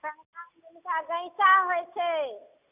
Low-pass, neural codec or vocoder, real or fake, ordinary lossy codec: 3.6 kHz; none; real; none